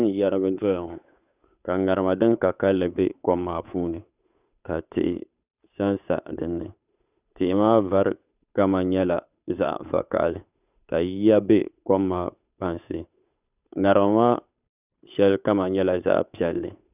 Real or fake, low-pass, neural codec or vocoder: fake; 3.6 kHz; codec, 16 kHz, 8 kbps, FunCodec, trained on Chinese and English, 25 frames a second